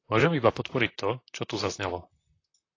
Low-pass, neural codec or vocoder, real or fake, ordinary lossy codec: 7.2 kHz; vocoder, 44.1 kHz, 128 mel bands, Pupu-Vocoder; fake; AAC, 32 kbps